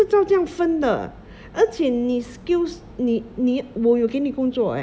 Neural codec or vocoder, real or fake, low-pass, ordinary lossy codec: none; real; none; none